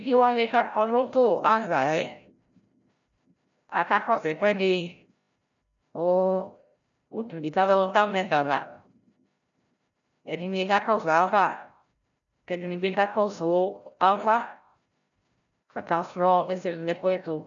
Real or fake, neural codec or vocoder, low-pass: fake; codec, 16 kHz, 0.5 kbps, FreqCodec, larger model; 7.2 kHz